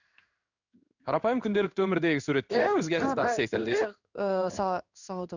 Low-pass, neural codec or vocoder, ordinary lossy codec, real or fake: 7.2 kHz; codec, 16 kHz in and 24 kHz out, 1 kbps, XY-Tokenizer; none; fake